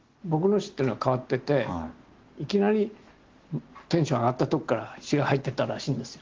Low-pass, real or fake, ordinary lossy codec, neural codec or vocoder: 7.2 kHz; real; Opus, 16 kbps; none